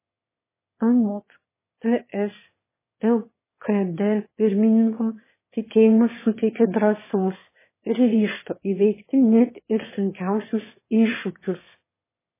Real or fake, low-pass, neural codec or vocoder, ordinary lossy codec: fake; 3.6 kHz; autoencoder, 22.05 kHz, a latent of 192 numbers a frame, VITS, trained on one speaker; MP3, 16 kbps